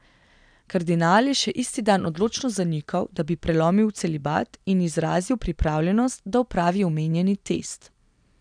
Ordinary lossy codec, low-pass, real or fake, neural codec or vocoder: none; 9.9 kHz; real; none